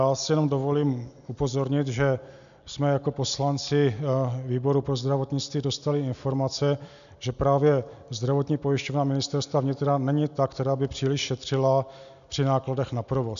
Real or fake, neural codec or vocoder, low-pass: real; none; 7.2 kHz